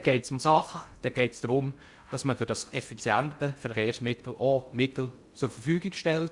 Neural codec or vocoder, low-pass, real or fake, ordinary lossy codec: codec, 16 kHz in and 24 kHz out, 0.6 kbps, FocalCodec, streaming, 2048 codes; 10.8 kHz; fake; Opus, 64 kbps